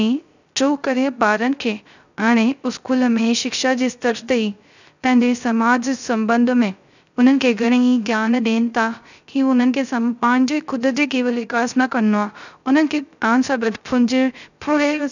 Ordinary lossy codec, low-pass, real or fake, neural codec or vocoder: none; 7.2 kHz; fake; codec, 16 kHz, 0.3 kbps, FocalCodec